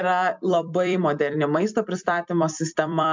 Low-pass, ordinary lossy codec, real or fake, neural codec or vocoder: 7.2 kHz; MP3, 64 kbps; fake; vocoder, 44.1 kHz, 128 mel bands every 256 samples, BigVGAN v2